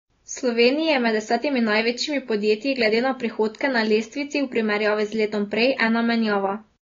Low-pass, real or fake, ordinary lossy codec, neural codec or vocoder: 7.2 kHz; real; AAC, 32 kbps; none